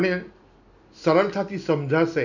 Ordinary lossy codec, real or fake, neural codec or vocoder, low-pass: none; real; none; 7.2 kHz